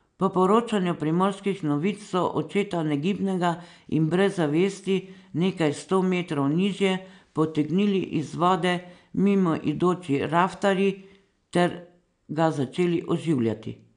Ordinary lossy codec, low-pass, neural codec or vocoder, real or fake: none; 9.9 kHz; none; real